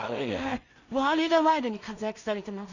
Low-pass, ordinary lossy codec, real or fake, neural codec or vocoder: 7.2 kHz; none; fake; codec, 16 kHz in and 24 kHz out, 0.4 kbps, LongCat-Audio-Codec, two codebook decoder